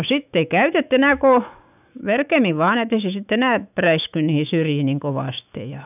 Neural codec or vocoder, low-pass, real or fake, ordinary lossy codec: autoencoder, 48 kHz, 128 numbers a frame, DAC-VAE, trained on Japanese speech; 3.6 kHz; fake; none